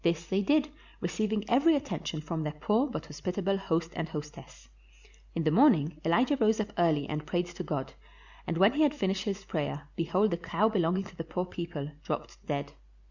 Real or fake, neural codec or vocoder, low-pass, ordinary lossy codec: real; none; 7.2 kHz; Opus, 64 kbps